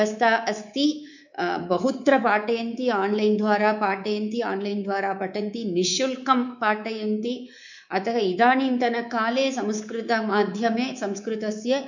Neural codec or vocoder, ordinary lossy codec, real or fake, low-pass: codec, 24 kHz, 3.1 kbps, DualCodec; none; fake; 7.2 kHz